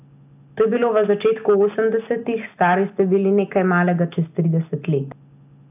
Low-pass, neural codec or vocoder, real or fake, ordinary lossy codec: 3.6 kHz; none; real; AAC, 32 kbps